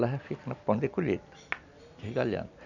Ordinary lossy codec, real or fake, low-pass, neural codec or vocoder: none; real; 7.2 kHz; none